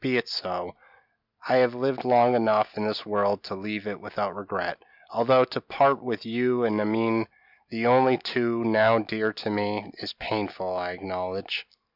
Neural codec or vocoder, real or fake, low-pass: none; real; 5.4 kHz